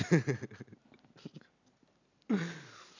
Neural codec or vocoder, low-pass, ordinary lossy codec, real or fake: none; 7.2 kHz; none; real